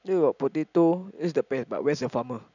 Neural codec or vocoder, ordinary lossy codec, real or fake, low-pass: none; none; real; 7.2 kHz